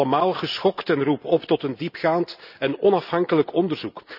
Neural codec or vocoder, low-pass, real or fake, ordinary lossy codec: none; 5.4 kHz; real; none